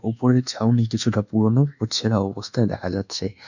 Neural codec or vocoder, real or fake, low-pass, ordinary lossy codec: codec, 24 kHz, 1.2 kbps, DualCodec; fake; 7.2 kHz; none